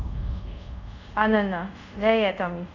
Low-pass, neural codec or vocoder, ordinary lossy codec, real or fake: 7.2 kHz; codec, 24 kHz, 0.5 kbps, DualCodec; none; fake